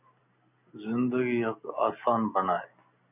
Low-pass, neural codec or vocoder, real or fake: 3.6 kHz; none; real